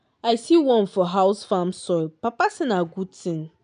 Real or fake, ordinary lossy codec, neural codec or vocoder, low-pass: real; none; none; 9.9 kHz